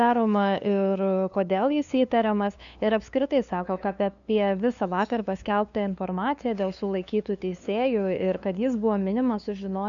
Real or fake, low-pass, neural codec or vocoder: fake; 7.2 kHz; codec, 16 kHz, 2 kbps, FunCodec, trained on LibriTTS, 25 frames a second